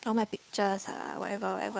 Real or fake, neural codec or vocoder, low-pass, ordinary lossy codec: fake; codec, 16 kHz, 2 kbps, FunCodec, trained on Chinese and English, 25 frames a second; none; none